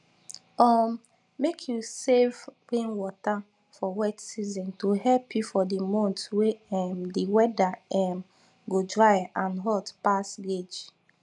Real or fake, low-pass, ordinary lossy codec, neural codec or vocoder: real; 10.8 kHz; none; none